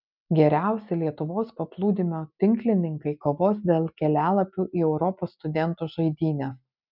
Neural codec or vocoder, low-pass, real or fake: none; 5.4 kHz; real